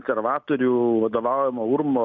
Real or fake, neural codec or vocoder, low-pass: real; none; 7.2 kHz